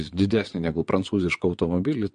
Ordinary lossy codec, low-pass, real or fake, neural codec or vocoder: MP3, 48 kbps; 9.9 kHz; fake; vocoder, 22.05 kHz, 80 mel bands, WaveNeXt